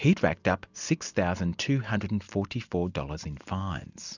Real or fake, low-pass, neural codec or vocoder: real; 7.2 kHz; none